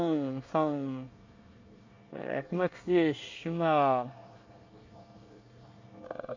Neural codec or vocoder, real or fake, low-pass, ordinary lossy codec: codec, 24 kHz, 1 kbps, SNAC; fake; 7.2 kHz; MP3, 48 kbps